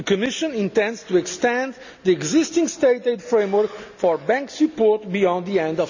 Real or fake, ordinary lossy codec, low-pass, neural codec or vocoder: real; none; 7.2 kHz; none